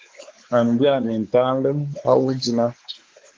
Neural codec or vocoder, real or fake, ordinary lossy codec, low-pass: codec, 16 kHz, 4 kbps, X-Codec, WavLM features, trained on Multilingual LibriSpeech; fake; Opus, 16 kbps; 7.2 kHz